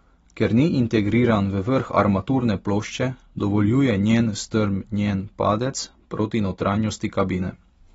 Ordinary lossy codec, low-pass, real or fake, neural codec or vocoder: AAC, 24 kbps; 19.8 kHz; real; none